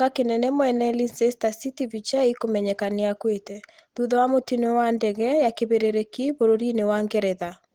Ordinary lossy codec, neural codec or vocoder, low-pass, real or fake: Opus, 16 kbps; none; 19.8 kHz; real